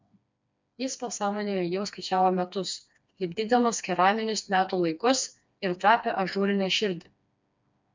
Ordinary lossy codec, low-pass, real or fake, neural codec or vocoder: MP3, 64 kbps; 7.2 kHz; fake; codec, 16 kHz, 2 kbps, FreqCodec, smaller model